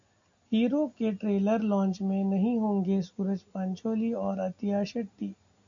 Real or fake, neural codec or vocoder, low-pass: real; none; 7.2 kHz